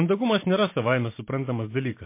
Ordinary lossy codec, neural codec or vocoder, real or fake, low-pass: MP3, 24 kbps; vocoder, 44.1 kHz, 128 mel bands every 512 samples, BigVGAN v2; fake; 3.6 kHz